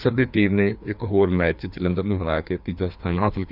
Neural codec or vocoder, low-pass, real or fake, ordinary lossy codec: codec, 16 kHz, 2 kbps, FreqCodec, larger model; 5.4 kHz; fake; none